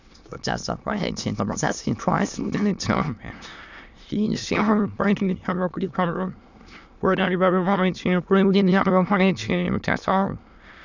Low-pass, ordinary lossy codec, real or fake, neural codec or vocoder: 7.2 kHz; none; fake; autoencoder, 22.05 kHz, a latent of 192 numbers a frame, VITS, trained on many speakers